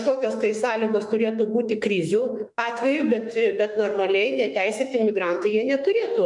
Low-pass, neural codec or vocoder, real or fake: 10.8 kHz; autoencoder, 48 kHz, 32 numbers a frame, DAC-VAE, trained on Japanese speech; fake